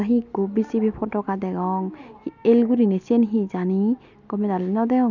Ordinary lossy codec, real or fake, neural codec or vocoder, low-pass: none; real; none; 7.2 kHz